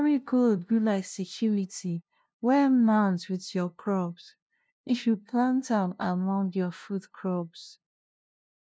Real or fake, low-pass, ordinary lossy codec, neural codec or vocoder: fake; none; none; codec, 16 kHz, 0.5 kbps, FunCodec, trained on LibriTTS, 25 frames a second